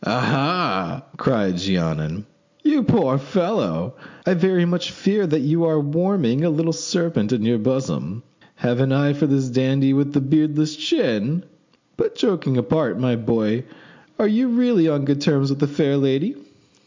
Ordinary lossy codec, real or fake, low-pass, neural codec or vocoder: MP3, 64 kbps; real; 7.2 kHz; none